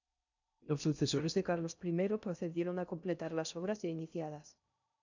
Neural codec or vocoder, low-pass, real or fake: codec, 16 kHz in and 24 kHz out, 0.6 kbps, FocalCodec, streaming, 4096 codes; 7.2 kHz; fake